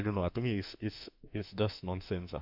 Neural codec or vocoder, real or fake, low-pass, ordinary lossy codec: autoencoder, 48 kHz, 32 numbers a frame, DAC-VAE, trained on Japanese speech; fake; 5.4 kHz; none